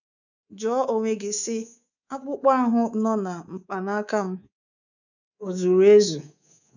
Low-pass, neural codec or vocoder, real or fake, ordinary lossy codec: 7.2 kHz; codec, 24 kHz, 3.1 kbps, DualCodec; fake; none